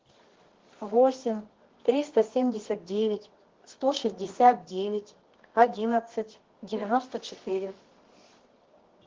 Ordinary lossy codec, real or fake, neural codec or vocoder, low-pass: Opus, 16 kbps; fake; codec, 24 kHz, 0.9 kbps, WavTokenizer, medium music audio release; 7.2 kHz